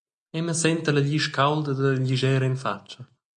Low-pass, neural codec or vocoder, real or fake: 9.9 kHz; none; real